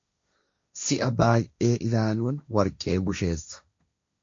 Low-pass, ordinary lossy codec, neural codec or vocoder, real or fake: 7.2 kHz; MP3, 48 kbps; codec, 16 kHz, 1.1 kbps, Voila-Tokenizer; fake